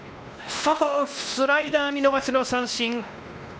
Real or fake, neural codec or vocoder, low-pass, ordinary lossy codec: fake; codec, 16 kHz, 1 kbps, X-Codec, WavLM features, trained on Multilingual LibriSpeech; none; none